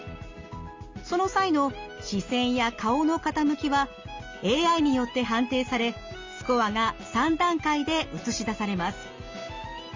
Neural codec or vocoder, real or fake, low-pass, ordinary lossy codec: none; real; 7.2 kHz; Opus, 32 kbps